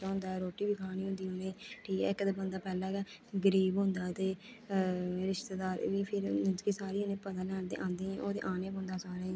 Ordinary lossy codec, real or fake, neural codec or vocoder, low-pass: none; real; none; none